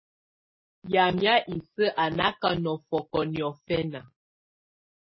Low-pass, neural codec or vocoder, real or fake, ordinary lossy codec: 7.2 kHz; none; real; MP3, 24 kbps